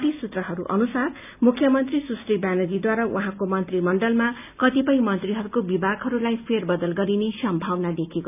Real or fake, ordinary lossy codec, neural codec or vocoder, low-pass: real; none; none; 3.6 kHz